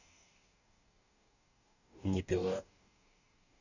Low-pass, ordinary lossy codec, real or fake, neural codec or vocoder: 7.2 kHz; none; fake; codec, 44.1 kHz, 2.6 kbps, DAC